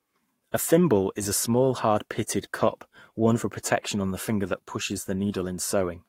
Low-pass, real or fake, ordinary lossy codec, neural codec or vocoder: 19.8 kHz; fake; AAC, 48 kbps; codec, 44.1 kHz, 7.8 kbps, Pupu-Codec